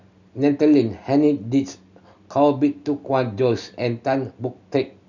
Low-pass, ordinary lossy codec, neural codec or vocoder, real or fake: 7.2 kHz; none; none; real